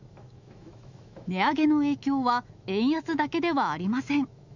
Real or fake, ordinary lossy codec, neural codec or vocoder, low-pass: fake; none; autoencoder, 48 kHz, 128 numbers a frame, DAC-VAE, trained on Japanese speech; 7.2 kHz